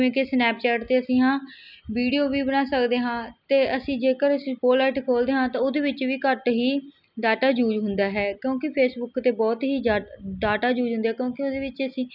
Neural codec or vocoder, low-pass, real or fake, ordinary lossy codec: none; 5.4 kHz; real; none